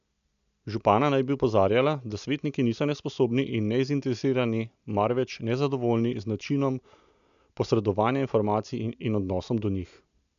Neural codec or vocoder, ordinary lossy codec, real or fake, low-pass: none; none; real; 7.2 kHz